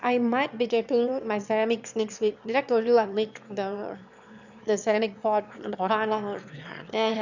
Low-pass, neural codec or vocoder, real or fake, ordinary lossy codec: 7.2 kHz; autoencoder, 22.05 kHz, a latent of 192 numbers a frame, VITS, trained on one speaker; fake; none